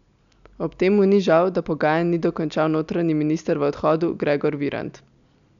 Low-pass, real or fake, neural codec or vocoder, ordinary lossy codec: 7.2 kHz; real; none; none